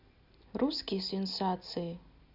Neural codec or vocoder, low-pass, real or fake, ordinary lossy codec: none; 5.4 kHz; real; Opus, 64 kbps